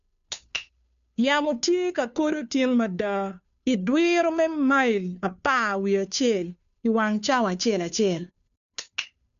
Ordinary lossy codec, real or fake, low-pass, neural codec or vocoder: none; fake; 7.2 kHz; codec, 16 kHz, 2 kbps, FunCodec, trained on Chinese and English, 25 frames a second